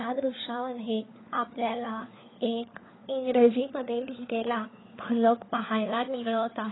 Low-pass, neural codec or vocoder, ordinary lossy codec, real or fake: 7.2 kHz; codec, 16 kHz, 4 kbps, FunCodec, trained on LibriTTS, 50 frames a second; AAC, 16 kbps; fake